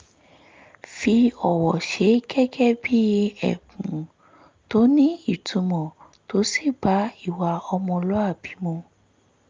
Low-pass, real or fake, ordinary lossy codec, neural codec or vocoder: 7.2 kHz; real; Opus, 24 kbps; none